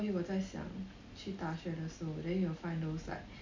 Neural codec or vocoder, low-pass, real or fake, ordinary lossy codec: none; 7.2 kHz; real; MP3, 64 kbps